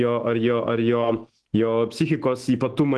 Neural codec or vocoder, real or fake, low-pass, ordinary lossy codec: autoencoder, 48 kHz, 128 numbers a frame, DAC-VAE, trained on Japanese speech; fake; 10.8 kHz; Opus, 16 kbps